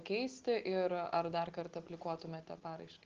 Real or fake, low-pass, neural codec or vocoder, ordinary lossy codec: real; 7.2 kHz; none; Opus, 16 kbps